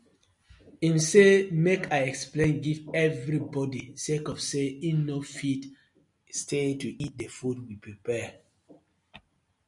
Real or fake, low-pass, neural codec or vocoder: real; 10.8 kHz; none